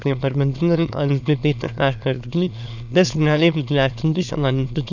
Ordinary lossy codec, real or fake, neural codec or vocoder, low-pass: none; fake; autoencoder, 22.05 kHz, a latent of 192 numbers a frame, VITS, trained on many speakers; 7.2 kHz